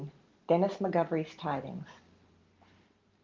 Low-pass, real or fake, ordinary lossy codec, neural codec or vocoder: 7.2 kHz; real; Opus, 32 kbps; none